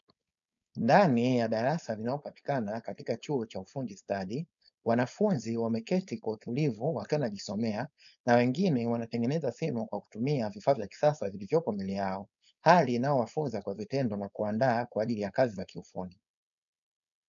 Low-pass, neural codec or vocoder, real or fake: 7.2 kHz; codec, 16 kHz, 4.8 kbps, FACodec; fake